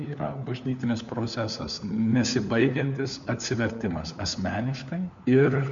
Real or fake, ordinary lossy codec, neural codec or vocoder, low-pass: fake; MP3, 64 kbps; codec, 16 kHz, 4 kbps, FunCodec, trained on LibriTTS, 50 frames a second; 7.2 kHz